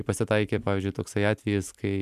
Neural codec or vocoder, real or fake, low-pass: none; real; 14.4 kHz